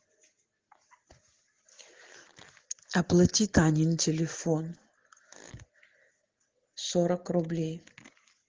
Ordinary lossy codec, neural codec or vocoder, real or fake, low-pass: Opus, 16 kbps; none; real; 7.2 kHz